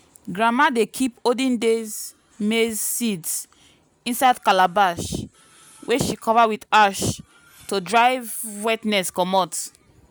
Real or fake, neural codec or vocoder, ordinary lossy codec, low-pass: real; none; none; none